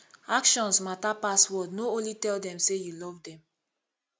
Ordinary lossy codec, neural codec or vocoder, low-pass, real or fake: none; none; none; real